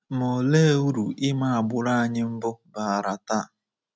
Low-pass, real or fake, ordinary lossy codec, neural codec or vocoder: none; real; none; none